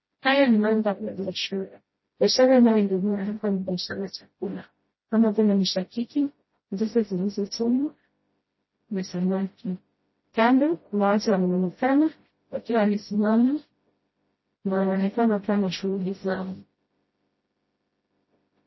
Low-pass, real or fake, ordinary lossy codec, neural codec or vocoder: 7.2 kHz; fake; MP3, 24 kbps; codec, 16 kHz, 0.5 kbps, FreqCodec, smaller model